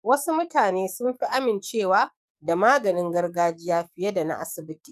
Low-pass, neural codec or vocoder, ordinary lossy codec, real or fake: 14.4 kHz; codec, 44.1 kHz, 7.8 kbps, DAC; none; fake